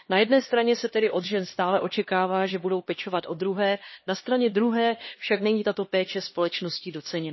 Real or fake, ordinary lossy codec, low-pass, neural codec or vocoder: fake; MP3, 24 kbps; 7.2 kHz; codec, 16 kHz, 2 kbps, X-Codec, HuBERT features, trained on LibriSpeech